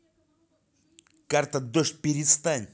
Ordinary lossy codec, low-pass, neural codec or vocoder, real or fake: none; none; none; real